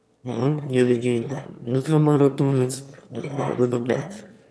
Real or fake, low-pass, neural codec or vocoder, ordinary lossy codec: fake; none; autoencoder, 22.05 kHz, a latent of 192 numbers a frame, VITS, trained on one speaker; none